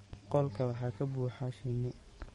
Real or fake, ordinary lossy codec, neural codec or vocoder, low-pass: fake; MP3, 48 kbps; codec, 44.1 kHz, 7.8 kbps, DAC; 19.8 kHz